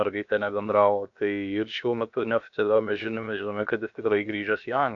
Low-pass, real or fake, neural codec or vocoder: 7.2 kHz; fake; codec, 16 kHz, about 1 kbps, DyCAST, with the encoder's durations